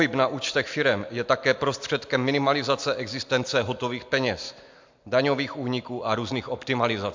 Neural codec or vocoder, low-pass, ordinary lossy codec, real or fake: none; 7.2 kHz; MP3, 64 kbps; real